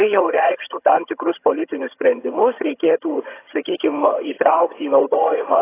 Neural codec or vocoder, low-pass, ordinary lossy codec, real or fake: vocoder, 22.05 kHz, 80 mel bands, HiFi-GAN; 3.6 kHz; AAC, 16 kbps; fake